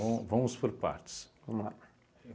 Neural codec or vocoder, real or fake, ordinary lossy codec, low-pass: none; real; none; none